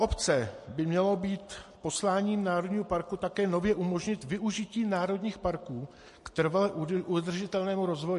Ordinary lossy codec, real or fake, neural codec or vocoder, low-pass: MP3, 48 kbps; real; none; 14.4 kHz